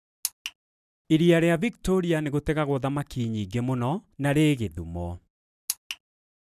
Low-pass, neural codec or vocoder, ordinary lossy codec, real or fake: 14.4 kHz; none; none; real